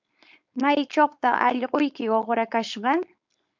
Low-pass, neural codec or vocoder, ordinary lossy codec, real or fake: 7.2 kHz; codec, 16 kHz, 4.8 kbps, FACodec; MP3, 64 kbps; fake